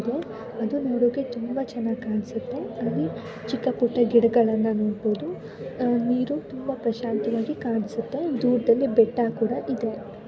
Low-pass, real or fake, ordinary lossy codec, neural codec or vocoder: none; real; none; none